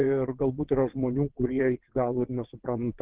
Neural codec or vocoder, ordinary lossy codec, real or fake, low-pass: vocoder, 22.05 kHz, 80 mel bands, WaveNeXt; Opus, 16 kbps; fake; 3.6 kHz